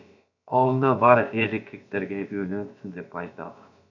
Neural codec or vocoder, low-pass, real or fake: codec, 16 kHz, about 1 kbps, DyCAST, with the encoder's durations; 7.2 kHz; fake